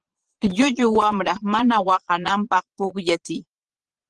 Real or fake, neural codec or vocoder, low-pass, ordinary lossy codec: fake; vocoder, 22.05 kHz, 80 mel bands, Vocos; 9.9 kHz; Opus, 16 kbps